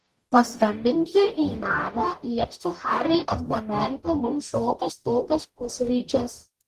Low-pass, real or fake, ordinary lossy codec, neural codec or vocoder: 14.4 kHz; fake; Opus, 24 kbps; codec, 44.1 kHz, 0.9 kbps, DAC